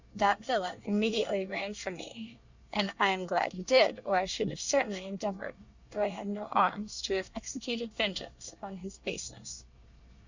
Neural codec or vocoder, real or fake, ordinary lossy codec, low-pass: codec, 24 kHz, 1 kbps, SNAC; fake; Opus, 64 kbps; 7.2 kHz